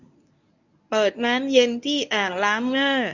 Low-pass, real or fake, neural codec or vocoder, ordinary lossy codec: 7.2 kHz; fake; codec, 24 kHz, 0.9 kbps, WavTokenizer, medium speech release version 1; none